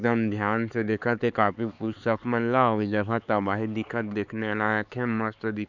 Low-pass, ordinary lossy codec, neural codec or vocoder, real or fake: 7.2 kHz; none; codec, 16 kHz, 4 kbps, X-Codec, HuBERT features, trained on LibriSpeech; fake